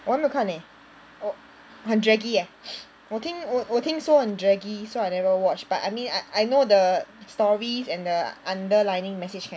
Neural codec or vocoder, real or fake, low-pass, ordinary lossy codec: none; real; none; none